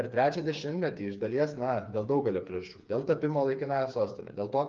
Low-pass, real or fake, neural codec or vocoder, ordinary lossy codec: 7.2 kHz; fake; codec, 16 kHz, 4 kbps, FreqCodec, smaller model; Opus, 24 kbps